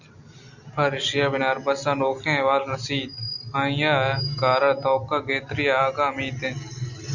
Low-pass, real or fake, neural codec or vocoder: 7.2 kHz; real; none